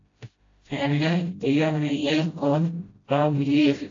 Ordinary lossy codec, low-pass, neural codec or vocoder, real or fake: AAC, 32 kbps; 7.2 kHz; codec, 16 kHz, 0.5 kbps, FreqCodec, smaller model; fake